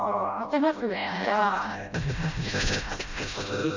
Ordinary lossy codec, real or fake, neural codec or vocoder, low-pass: MP3, 64 kbps; fake; codec, 16 kHz, 0.5 kbps, FreqCodec, smaller model; 7.2 kHz